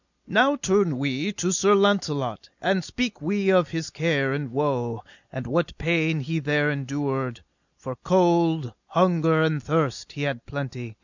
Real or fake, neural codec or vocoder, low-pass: real; none; 7.2 kHz